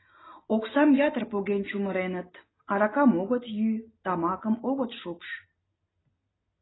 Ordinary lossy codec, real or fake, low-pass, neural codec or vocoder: AAC, 16 kbps; real; 7.2 kHz; none